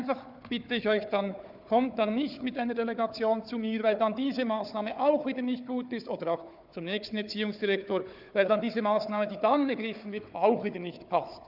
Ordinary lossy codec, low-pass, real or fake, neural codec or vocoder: none; 5.4 kHz; fake; codec, 16 kHz, 4 kbps, FunCodec, trained on Chinese and English, 50 frames a second